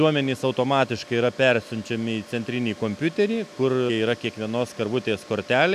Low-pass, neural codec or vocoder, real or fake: 14.4 kHz; none; real